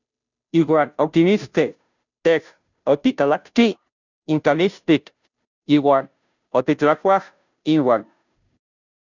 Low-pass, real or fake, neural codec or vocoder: 7.2 kHz; fake; codec, 16 kHz, 0.5 kbps, FunCodec, trained on Chinese and English, 25 frames a second